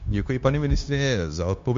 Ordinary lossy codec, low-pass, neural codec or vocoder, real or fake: MP3, 48 kbps; 7.2 kHz; codec, 16 kHz, 0.9 kbps, LongCat-Audio-Codec; fake